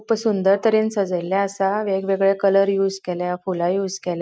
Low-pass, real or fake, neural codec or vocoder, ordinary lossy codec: none; real; none; none